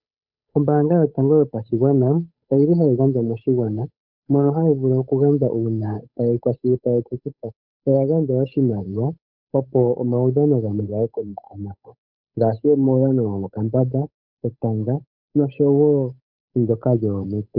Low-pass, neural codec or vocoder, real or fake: 5.4 kHz; codec, 16 kHz, 8 kbps, FunCodec, trained on Chinese and English, 25 frames a second; fake